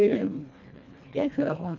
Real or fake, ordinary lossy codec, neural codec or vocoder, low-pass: fake; none; codec, 24 kHz, 1.5 kbps, HILCodec; 7.2 kHz